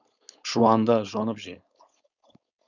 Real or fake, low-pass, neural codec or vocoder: fake; 7.2 kHz; codec, 16 kHz, 4.8 kbps, FACodec